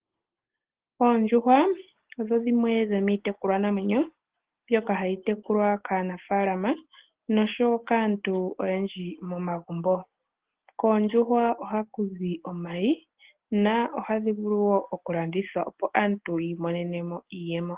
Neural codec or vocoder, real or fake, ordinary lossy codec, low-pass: none; real; Opus, 16 kbps; 3.6 kHz